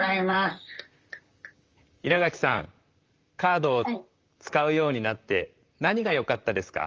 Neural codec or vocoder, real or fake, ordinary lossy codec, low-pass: vocoder, 44.1 kHz, 128 mel bands, Pupu-Vocoder; fake; Opus, 32 kbps; 7.2 kHz